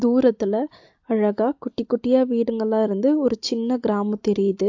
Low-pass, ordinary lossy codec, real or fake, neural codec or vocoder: 7.2 kHz; AAC, 48 kbps; real; none